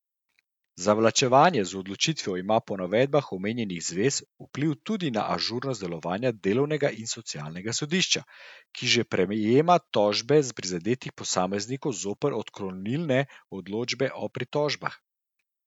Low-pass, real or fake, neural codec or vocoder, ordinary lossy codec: 19.8 kHz; real; none; none